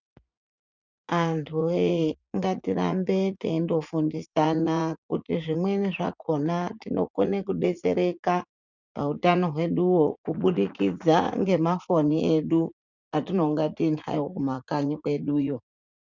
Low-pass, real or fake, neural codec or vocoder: 7.2 kHz; fake; vocoder, 44.1 kHz, 80 mel bands, Vocos